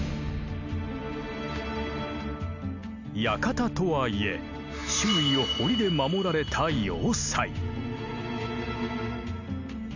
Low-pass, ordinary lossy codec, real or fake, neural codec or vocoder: 7.2 kHz; none; real; none